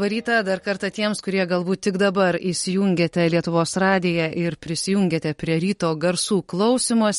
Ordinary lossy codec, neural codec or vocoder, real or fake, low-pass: MP3, 48 kbps; none; real; 19.8 kHz